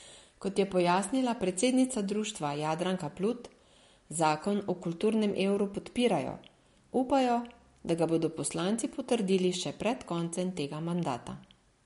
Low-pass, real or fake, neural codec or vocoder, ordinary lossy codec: 10.8 kHz; real; none; MP3, 48 kbps